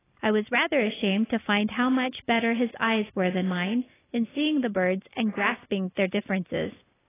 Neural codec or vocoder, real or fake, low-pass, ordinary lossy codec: none; real; 3.6 kHz; AAC, 16 kbps